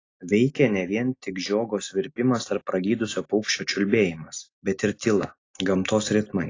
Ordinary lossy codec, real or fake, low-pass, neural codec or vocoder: AAC, 32 kbps; real; 7.2 kHz; none